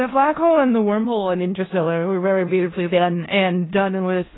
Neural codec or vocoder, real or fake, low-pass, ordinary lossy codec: codec, 16 kHz in and 24 kHz out, 0.4 kbps, LongCat-Audio-Codec, four codebook decoder; fake; 7.2 kHz; AAC, 16 kbps